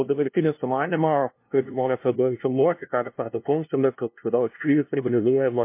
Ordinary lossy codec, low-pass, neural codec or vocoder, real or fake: MP3, 24 kbps; 3.6 kHz; codec, 16 kHz, 0.5 kbps, FunCodec, trained on LibriTTS, 25 frames a second; fake